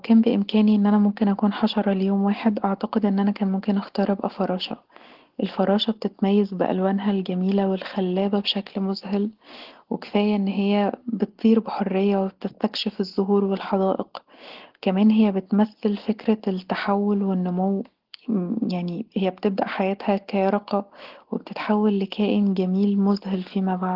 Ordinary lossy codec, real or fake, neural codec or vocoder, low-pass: Opus, 16 kbps; real; none; 5.4 kHz